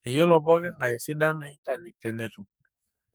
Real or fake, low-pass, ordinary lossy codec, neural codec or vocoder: fake; none; none; codec, 44.1 kHz, 2.6 kbps, SNAC